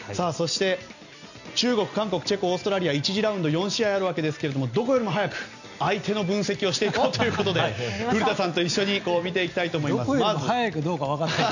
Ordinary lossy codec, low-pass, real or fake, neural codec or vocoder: none; 7.2 kHz; real; none